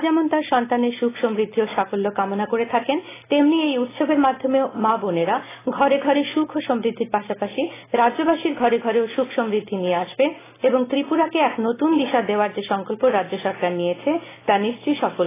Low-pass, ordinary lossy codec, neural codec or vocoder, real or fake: 3.6 kHz; AAC, 16 kbps; none; real